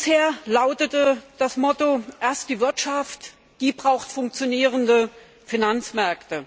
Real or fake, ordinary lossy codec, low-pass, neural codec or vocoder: real; none; none; none